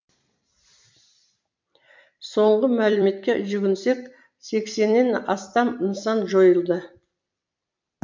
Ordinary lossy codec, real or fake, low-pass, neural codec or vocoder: MP3, 64 kbps; fake; 7.2 kHz; vocoder, 44.1 kHz, 128 mel bands every 256 samples, BigVGAN v2